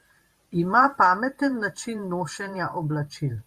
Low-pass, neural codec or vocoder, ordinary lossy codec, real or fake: 14.4 kHz; vocoder, 44.1 kHz, 128 mel bands every 512 samples, BigVGAN v2; Opus, 64 kbps; fake